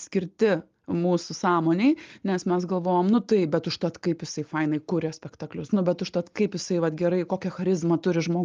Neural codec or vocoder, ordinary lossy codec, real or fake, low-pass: none; Opus, 32 kbps; real; 7.2 kHz